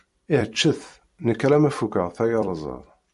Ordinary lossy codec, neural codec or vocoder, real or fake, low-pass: MP3, 48 kbps; vocoder, 44.1 kHz, 128 mel bands every 256 samples, BigVGAN v2; fake; 14.4 kHz